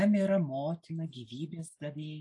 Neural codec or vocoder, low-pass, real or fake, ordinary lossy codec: none; 10.8 kHz; real; AAC, 64 kbps